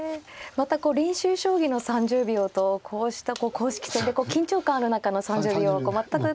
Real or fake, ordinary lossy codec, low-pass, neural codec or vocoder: real; none; none; none